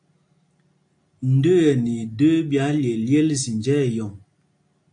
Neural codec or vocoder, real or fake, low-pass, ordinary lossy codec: none; real; 9.9 kHz; AAC, 64 kbps